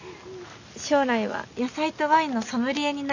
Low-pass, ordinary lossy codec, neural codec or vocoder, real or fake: 7.2 kHz; none; none; real